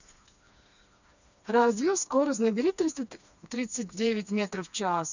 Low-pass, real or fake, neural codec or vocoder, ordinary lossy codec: 7.2 kHz; fake; codec, 16 kHz, 2 kbps, FreqCodec, smaller model; none